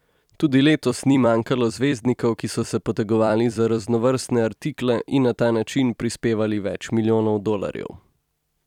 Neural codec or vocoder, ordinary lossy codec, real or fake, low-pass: vocoder, 44.1 kHz, 128 mel bands every 256 samples, BigVGAN v2; none; fake; 19.8 kHz